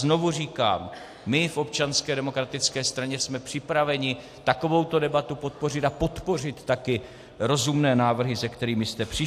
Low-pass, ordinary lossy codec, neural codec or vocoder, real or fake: 14.4 kHz; AAC, 64 kbps; none; real